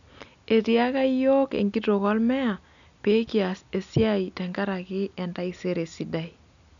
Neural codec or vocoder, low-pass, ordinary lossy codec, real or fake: none; 7.2 kHz; none; real